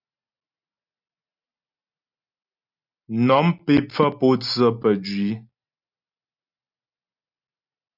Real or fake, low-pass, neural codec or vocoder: real; 5.4 kHz; none